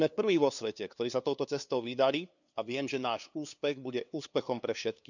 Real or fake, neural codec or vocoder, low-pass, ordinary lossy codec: fake; codec, 16 kHz, 2 kbps, FunCodec, trained on LibriTTS, 25 frames a second; 7.2 kHz; none